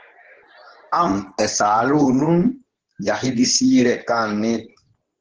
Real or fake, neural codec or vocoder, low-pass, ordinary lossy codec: fake; codec, 24 kHz, 0.9 kbps, WavTokenizer, medium speech release version 2; 7.2 kHz; Opus, 16 kbps